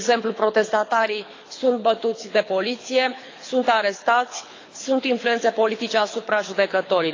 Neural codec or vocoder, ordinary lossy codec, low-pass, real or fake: codec, 24 kHz, 6 kbps, HILCodec; AAC, 32 kbps; 7.2 kHz; fake